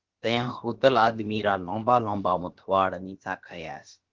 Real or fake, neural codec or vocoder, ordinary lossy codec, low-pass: fake; codec, 16 kHz, about 1 kbps, DyCAST, with the encoder's durations; Opus, 16 kbps; 7.2 kHz